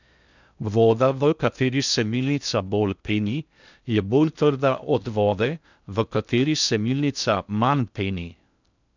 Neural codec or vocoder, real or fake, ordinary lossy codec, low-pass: codec, 16 kHz in and 24 kHz out, 0.6 kbps, FocalCodec, streaming, 2048 codes; fake; none; 7.2 kHz